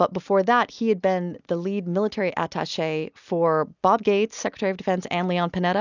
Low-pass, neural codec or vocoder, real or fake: 7.2 kHz; none; real